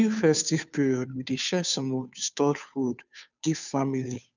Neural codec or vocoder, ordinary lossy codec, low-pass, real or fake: codec, 16 kHz, 2 kbps, FunCodec, trained on Chinese and English, 25 frames a second; none; 7.2 kHz; fake